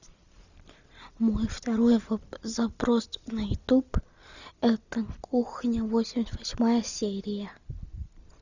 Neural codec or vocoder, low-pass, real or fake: none; 7.2 kHz; real